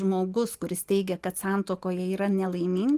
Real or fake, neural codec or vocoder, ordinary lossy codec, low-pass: real; none; Opus, 24 kbps; 14.4 kHz